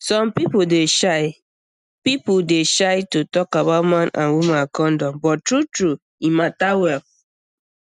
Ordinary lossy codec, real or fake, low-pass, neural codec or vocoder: none; real; 10.8 kHz; none